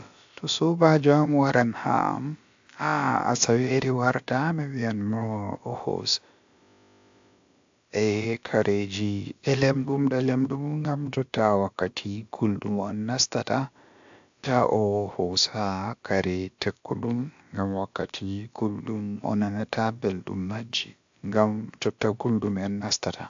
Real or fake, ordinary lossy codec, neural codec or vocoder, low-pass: fake; AAC, 64 kbps; codec, 16 kHz, about 1 kbps, DyCAST, with the encoder's durations; 7.2 kHz